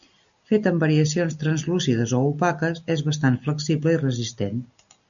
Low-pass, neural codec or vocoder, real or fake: 7.2 kHz; none; real